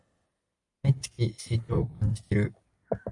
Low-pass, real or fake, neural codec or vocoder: 10.8 kHz; real; none